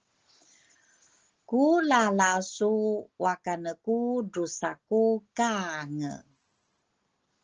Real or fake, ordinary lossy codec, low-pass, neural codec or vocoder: real; Opus, 16 kbps; 7.2 kHz; none